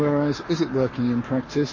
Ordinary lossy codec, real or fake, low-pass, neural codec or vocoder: MP3, 32 kbps; fake; 7.2 kHz; codec, 44.1 kHz, 7.8 kbps, Pupu-Codec